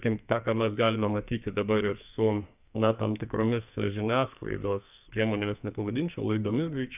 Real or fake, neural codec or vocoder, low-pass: fake; codec, 44.1 kHz, 2.6 kbps, SNAC; 3.6 kHz